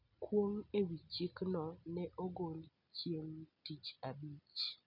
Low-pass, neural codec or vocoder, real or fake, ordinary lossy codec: 5.4 kHz; none; real; none